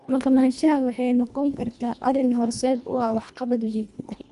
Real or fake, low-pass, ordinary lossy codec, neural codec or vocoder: fake; 10.8 kHz; none; codec, 24 kHz, 1.5 kbps, HILCodec